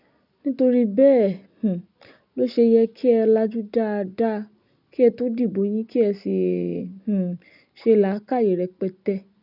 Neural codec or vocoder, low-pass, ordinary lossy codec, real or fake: none; 5.4 kHz; none; real